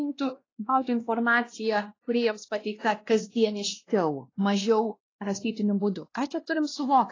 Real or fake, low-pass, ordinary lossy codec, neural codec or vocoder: fake; 7.2 kHz; AAC, 32 kbps; codec, 16 kHz, 1 kbps, X-Codec, WavLM features, trained on Multilingual LibriSpeech